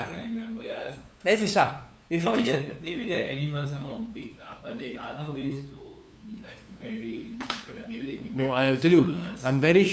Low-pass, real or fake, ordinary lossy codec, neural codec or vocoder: none; fake; none; codec, 16 kHz, 2 kbps, FunCodec, trained on LibriTTS, 25 frames a second